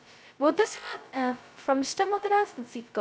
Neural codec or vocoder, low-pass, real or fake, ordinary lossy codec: codec, 16 kHz, 0.2 kbps, FocalCodec; none; fake; none